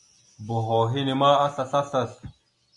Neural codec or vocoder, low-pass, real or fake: none; 10.8 kHz; real